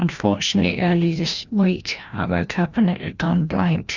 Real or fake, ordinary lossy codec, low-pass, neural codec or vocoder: fake; Opus, 64 kbps; 7.2 kHz; codec, 16 kHz, 1 kbps, FreqCodec, larger model